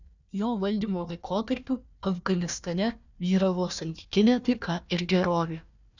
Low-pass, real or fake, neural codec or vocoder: 7.2 kHz; fake; codec, 16 kHz, 1 kbps, FunCodec, trained on Chinese and English, 50 frames a second